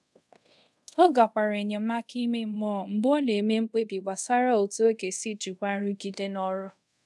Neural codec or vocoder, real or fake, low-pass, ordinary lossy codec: codec, 24 kHz, 0.5 kbps, DualCodec; fake; none; none